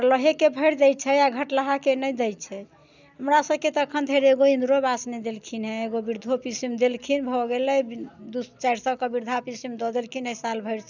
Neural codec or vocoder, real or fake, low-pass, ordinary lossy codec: none; real; 7.2 kHz; none